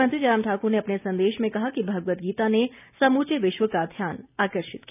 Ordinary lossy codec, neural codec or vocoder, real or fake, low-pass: none; none; real; 3.6 kHz